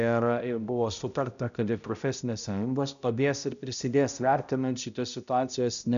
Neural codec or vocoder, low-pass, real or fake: codec, 16 kHz, 0.5 kbps, X-Codec, HuBERT features, trained on balanced general audio; 7.2 kHz; fake